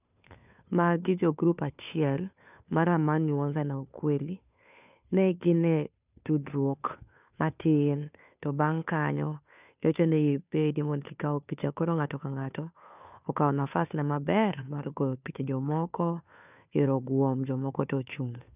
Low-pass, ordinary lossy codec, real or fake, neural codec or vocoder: 3.6 kHz; none; fake; codec, 16 kHz, 2 kbps, FunCodec, trained on Chinese and English, 25 frames a second